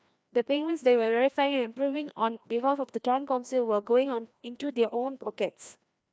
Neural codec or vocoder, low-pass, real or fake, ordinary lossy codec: codec, 16 kHz, 1 kbps, FreqCodec, larger model; none; fake; none